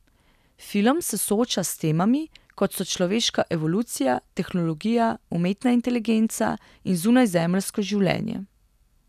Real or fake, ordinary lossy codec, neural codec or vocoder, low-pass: real; none; none; 14.4 kHz